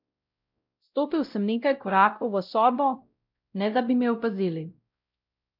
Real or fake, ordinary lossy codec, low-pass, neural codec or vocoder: fake; none; 5.4 kHz; codec, 16 kHz, 0.5 kbps, X-Codec, WavLM features, trained on Multilingual LibriSpeech